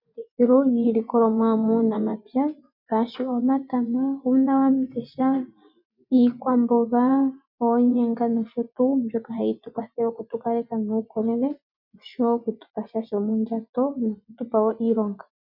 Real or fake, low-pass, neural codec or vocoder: fake; 5.4 kHz; vocoder, 24 kHz, 100 mel bands, Vocos